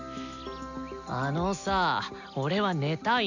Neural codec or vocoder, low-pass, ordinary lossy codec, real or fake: none; 7.2 kHz; none; real